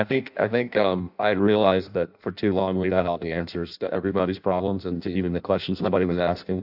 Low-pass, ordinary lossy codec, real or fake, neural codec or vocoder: 5.4 kHz; AAC, 48 kbps; fake; codec, 16 kHz in and 24 kHz out, 0.6 kbps, FireRedTTS-2 codec